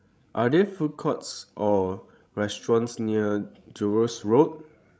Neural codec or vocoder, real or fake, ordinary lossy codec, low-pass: codec, 16 kHz, 16 kbps, FreqCodec, larger model; fake; none; none